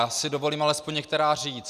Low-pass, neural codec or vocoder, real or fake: 14.4 kHz; none; real